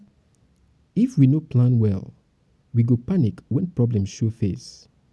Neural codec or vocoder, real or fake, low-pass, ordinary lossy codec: none; real; none; none